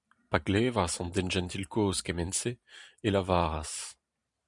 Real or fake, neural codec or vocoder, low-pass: fake; vocoder, 44.1 kHz, 128 mel bands every 512 samples, BigVGAN v2; 10.8 kHz